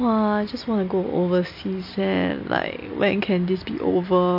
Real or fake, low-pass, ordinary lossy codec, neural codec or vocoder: real; 5.4 kHz; MP3, 32 kbps; none